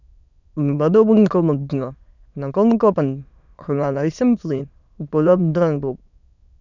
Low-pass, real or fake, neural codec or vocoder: 7.2 kHz; fake; autoencoder, 22.05 kHz, a latent of 192 numbers a frame, VITS, trained on many speakers